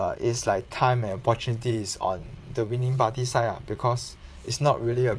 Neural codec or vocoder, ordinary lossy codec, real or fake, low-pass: vocoder, 22.05 kHz, 80 mel bands, WaveNeXt; none; fake; none